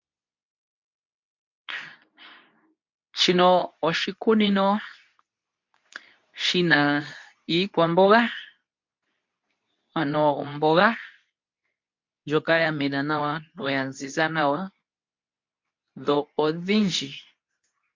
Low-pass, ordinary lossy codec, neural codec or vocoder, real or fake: 7.2 kHz; MP3, 48 kbps; codec, 24 kHz, 0.9 kbps, WavTokenizer, medium speech release version 2; fake